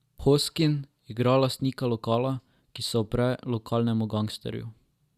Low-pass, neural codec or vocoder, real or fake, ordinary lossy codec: 14.4 kHz; none; real; Opus, 64 kbps